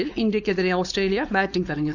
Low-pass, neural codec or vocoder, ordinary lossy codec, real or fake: 7.2 kHz; codec, 16 kHz, 4.8 kbps, FACodec; none; fake